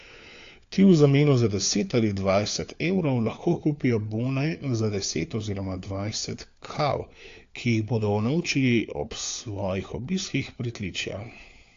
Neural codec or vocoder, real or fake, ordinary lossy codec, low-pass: codec, 16 kHz, 4 kbps, FunCodec, trained on LibriTTS, 50 frames a second; fake; AAC, 48 kbps; 7.2 kHz